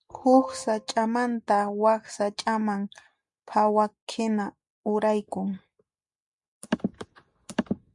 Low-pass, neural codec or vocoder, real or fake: 10.8 kHz; none; real